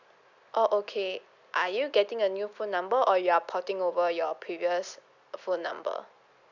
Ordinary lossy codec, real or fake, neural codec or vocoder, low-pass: none; real; none; 7.2 kHz